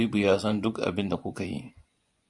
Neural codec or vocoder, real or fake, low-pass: vocoder, 24 kHz, 100 mel bands, Vocos; fake; 10.8 kHz